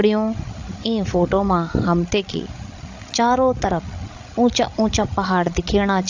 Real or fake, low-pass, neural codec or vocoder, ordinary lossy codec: fake; 7.2 kHz; codec, 16 kHz, 16 kbps, FreqCodec, larger model; AAC, 48 kbps